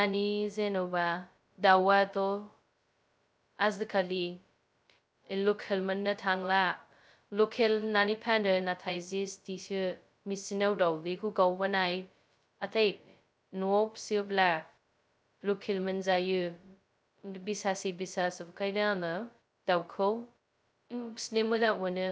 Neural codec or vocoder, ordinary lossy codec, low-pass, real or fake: codec, 16 kHz, 0.2 kbps, FocalCodec; none; none; fake